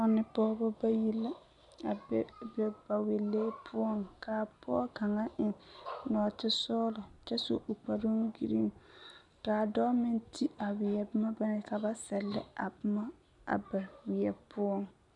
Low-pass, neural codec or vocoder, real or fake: 10.8 kHz; none; real